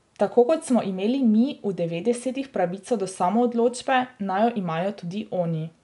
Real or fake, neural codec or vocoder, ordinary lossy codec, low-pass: real; none; none; 10.8 kHz